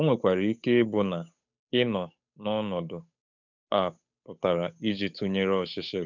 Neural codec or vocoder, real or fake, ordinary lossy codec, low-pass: codec, 16 kHz, 8 kbps, FunCodec, trained on Chinese and English, 25 frames a second; fake; none; 7.2 kHz